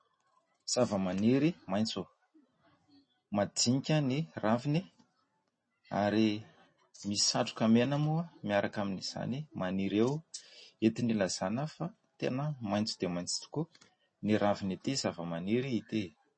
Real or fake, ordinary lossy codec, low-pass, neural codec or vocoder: real; MP3, 32 kbps; 9.9 kHz; none